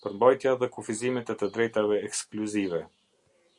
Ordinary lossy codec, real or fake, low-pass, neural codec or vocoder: Opus, 64 kbps; real; 10.8 kHz; none